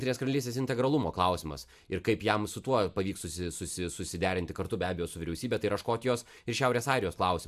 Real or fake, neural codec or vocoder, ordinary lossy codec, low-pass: real; none; AAC, 96 kbps; 14.4 kHz